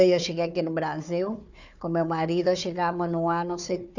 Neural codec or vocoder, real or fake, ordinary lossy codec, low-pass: codec, 16 kHz, 4 kbps, FunCodec, trained on Chinese and English, 50 frames a second; fake; none; 7.2 kHz